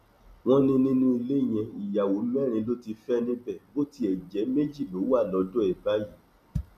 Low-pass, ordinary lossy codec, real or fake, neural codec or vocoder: 14.4 kHz; none; real; none